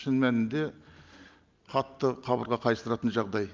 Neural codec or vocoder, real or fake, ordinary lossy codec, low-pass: none; real; Opus, 24 kbps; 7.2 kHz